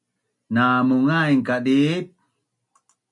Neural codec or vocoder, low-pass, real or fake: none; 10.8 kHz; real